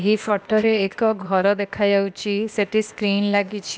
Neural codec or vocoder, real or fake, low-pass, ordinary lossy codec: codec, 16 kHz, 0.8 kbps, ZipCodec; fake; none; none